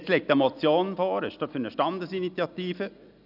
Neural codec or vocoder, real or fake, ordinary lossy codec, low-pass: none; real; none; 5.4 kHz